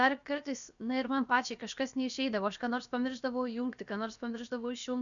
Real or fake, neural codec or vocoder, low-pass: fake; codec, 16 kHz, about 1 kbps, DyCAST, with the encoder's durations; 7.2 kHz